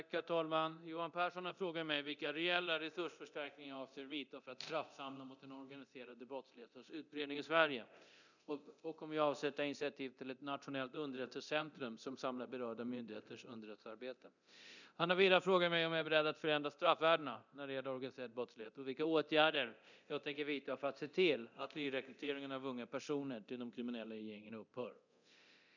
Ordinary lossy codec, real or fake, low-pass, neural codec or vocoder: none; fake; 7.2 kHz; codec, 24 kHz, 0.9 kbps, DualCodec